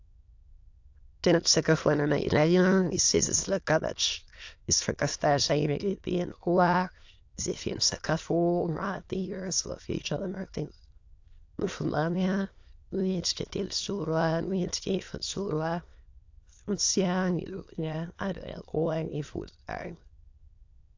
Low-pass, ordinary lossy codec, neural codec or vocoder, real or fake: 7.2 kHz; AAC, 48 kbps; autoencoder, 22.05 kHz, a latent of 192 numbers a frame, VITS, trained on many speakers; fake